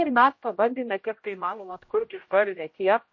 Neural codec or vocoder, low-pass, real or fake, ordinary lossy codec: codec, 16 kHz, 0.5 kbps, X-Codec, HuBERT features, trained on general audio; 7.2 kHz; fake; MP3, 32 kbps